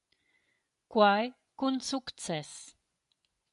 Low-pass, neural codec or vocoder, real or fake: 10.8 kHz; vocoder, 24 kHz, 100 mel bands, Vocos; fake